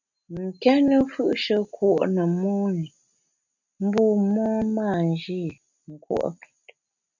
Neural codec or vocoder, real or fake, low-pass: none; real; 7.2 kHz